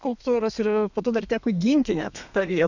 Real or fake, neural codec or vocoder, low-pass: fake; codec, 32 kHz, 1.9 kbps, SNAC; 7.2 kHz